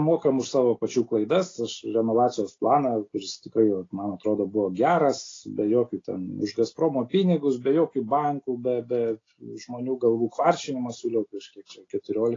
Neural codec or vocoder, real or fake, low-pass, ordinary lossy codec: none; real; 7.2 kHz; AAC, 32 kbps